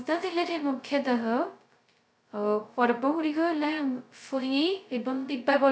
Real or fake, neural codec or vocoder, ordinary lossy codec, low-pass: fake; codec, 16 kHz, 0.2 kbps, FocalCodec; none; none